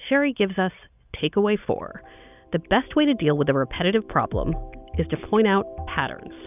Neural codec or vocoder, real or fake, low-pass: none; real; 3.6 kHz